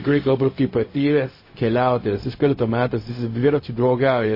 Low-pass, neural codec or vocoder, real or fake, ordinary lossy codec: 5.4 kHz; codec, 16 kHz, 0.4 kbps, LongCat-Audio-Codec; fake; MP3, 24 kbps